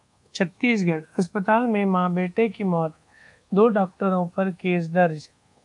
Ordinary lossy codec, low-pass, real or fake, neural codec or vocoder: AAC, 64 kbps; 10.8 kHz; fake; codec, 24 kHz, 1.2 kbps, DualCodec